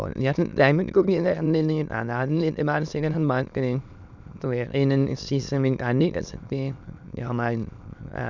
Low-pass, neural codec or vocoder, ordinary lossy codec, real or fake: 7.2 kHz; autoencoder, 22.05 kHz, a latent of 192 numbers a frame, VITS, trained on many speakers; none; fake